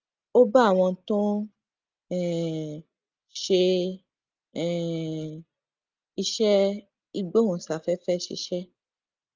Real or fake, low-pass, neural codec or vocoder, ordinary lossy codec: real; 7.2 kHz; none; Opus, 32 kbps